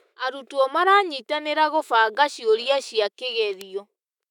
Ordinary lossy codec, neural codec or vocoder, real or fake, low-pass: none; autoencoder, 48 kHz, 128 numbers a frame, DAC-VAE, trained on Japanese speech; fake; 19.8 kHz